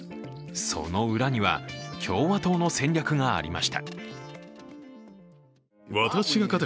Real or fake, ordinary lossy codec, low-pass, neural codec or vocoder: real; none; none; none